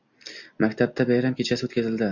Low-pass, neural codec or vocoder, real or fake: 7.2 kHz; none; real